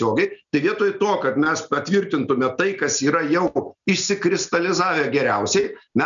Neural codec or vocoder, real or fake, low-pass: none; real; 7.2 kHz